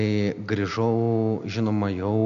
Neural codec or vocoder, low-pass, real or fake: none; 7.2 kHz; real